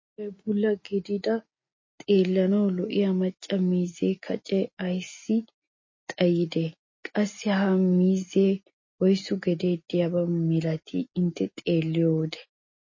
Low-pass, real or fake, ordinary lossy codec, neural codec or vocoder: 7.2 kHz; real; MP3, 32 kbps; none